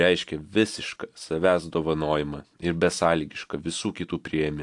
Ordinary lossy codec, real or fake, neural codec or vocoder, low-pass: AAC, 64 kbps; real; none; 10.8 kHz